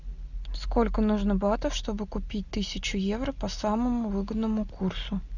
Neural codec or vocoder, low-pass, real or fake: none; 7.2 kHz; real